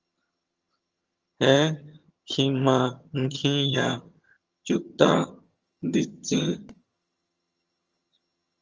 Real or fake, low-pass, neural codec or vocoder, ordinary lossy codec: fake; 7.2 kHz; vocoder, 22.05 kHz, 80 mel bands, HiFi-GAN; Opus, 24 kbps